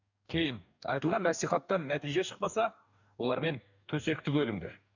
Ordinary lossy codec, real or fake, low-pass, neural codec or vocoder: none; fake; 7.2 kHz; codec, 44.1 kHz, 2.6 kbps, DAC